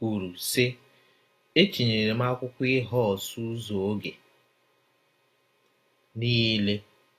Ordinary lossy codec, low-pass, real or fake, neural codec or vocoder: AAC, 48 kbps; 14.4 kHz; real; none